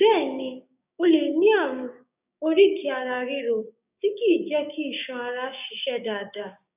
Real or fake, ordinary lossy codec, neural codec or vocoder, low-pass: fake; none; codec, 16 kHz, 6 kbps, DAC; 3.6 kHz